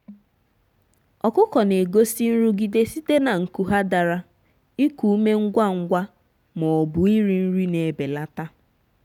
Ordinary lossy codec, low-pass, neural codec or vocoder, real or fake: none; 19.8 kHz; none; real